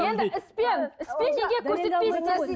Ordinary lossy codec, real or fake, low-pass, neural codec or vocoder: none; real; none; none